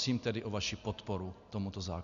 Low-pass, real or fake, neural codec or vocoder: 7.2 kHz; real; none